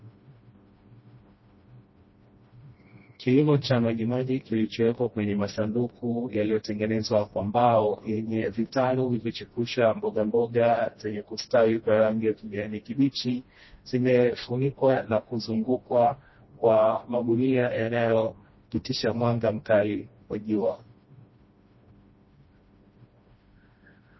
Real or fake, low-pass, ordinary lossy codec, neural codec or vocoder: fake; 7.2 kHz; MP3, 24 kbps; codec, 16 kHz, 1 kbps, FreqCodec, smaller model